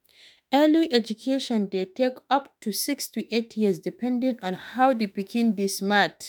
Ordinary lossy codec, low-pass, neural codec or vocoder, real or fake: none; none; autoencoder, 48 kHz, 32 numbers a frame, DAC-VAE, trained on Japanese speech; fake